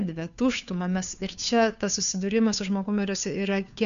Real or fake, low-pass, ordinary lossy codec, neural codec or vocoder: fake; 7.2 kHz; MP3, 96 kbps; codec, 16 kHz, 2 kbps, FunCodec, trained on Chinese and English, 25 frames a second